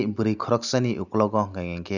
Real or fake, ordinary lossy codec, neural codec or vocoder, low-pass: real; none; none; 7.2 kHz